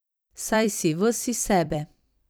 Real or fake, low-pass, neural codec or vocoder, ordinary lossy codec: fake; none; vocoder, 44.1 kHz, 128 mel bands every 256 samples, BigVGAN v2; none